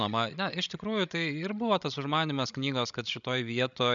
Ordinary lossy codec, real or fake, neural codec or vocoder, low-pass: MP3, 96 kbps; fake; codec, 16 kHz, 16 kbps, FreqCodec, larger model; 7.2 kHz